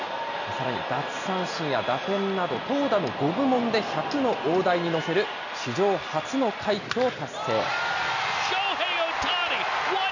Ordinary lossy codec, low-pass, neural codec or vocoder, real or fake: none; 7.2 kHz; none; real